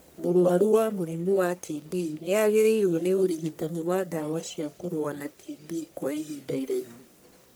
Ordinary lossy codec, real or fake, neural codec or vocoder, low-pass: none; fake; codec, 44.1 kHz, 1.7 kbps, Pupu-Codec; none